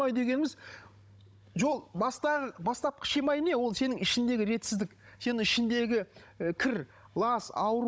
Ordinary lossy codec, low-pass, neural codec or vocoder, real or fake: none; none; codec, 16 kHz, 16 kbps, FunCodec, trained on Chinese and English, 50 frames a second; fake